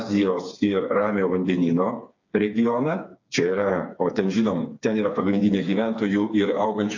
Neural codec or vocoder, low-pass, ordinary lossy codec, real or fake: codec, 16 kHz, 4 kbps, FreqCodec, smaller model; 7.2 kHz; AAC, 48 kbps; fake